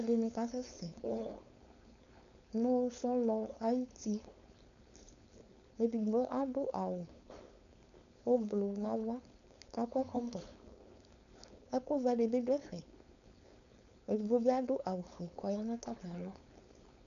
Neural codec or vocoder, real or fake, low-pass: codec, 16 kHz, 4.8 kbps, FACodec; fake; 7.2 kHz